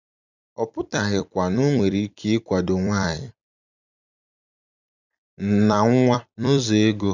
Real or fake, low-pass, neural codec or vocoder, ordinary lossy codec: fake; 7.2 kHz; vocoder, 44.1 kHz, 128 mel bands every 256 samples, BigVGAN v2; none